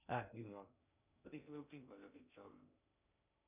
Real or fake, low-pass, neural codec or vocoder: fake; 3.6 kHz; codec, 16 kHz in and 24 kHz out, 0.6 kbps, FocalCodec, streaming, 2048 codes